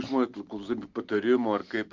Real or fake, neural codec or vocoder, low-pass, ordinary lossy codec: real; none; 7.2 kHz; Opus, 24 kbps